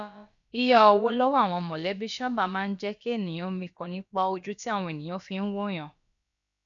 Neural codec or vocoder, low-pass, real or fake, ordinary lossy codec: codec, 16 kHz, about 1 kbps, DyCAST, with the encoder's durations; 7.2 kHz; fake; none